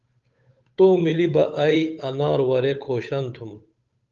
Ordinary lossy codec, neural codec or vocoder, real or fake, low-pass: Opus, 32 kbps; codec, 16 kHz, 8 kbps, FunCodec, trained on Chinese and English, 25 frames a second; fake; 7.2 kHz